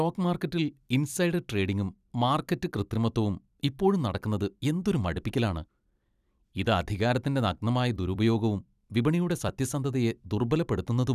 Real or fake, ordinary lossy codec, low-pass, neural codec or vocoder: fake; none; 14.4 kHz; vocoder, 44.1 kHz, 128 mel bands every 256 samples, BigVGAN v2